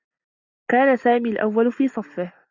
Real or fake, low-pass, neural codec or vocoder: real; 7.2 kHz; none